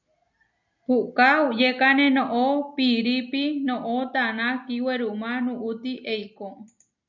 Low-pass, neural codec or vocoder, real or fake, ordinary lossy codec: 7.2 kHz; none; real; MP3, 64 kbps